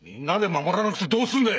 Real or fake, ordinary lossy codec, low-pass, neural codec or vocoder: fake; none; none; codec, 16 kHz, 16 kbps, FreqCodec, smaller model